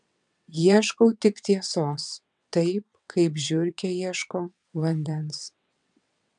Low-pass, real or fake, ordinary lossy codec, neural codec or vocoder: 9.9 kHz; fake; MP3, 96 kbps; vocoder, 22.05 kHz, 80 mel bands, WaveNeXt